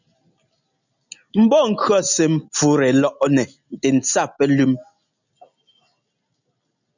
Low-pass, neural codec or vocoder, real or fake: 7.2 kHz; none; real